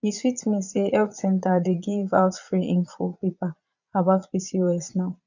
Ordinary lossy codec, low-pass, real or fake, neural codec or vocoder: none; 7.2 kHz; fake; vocoder, 22.05 kHz, 80 mel bands, Vocos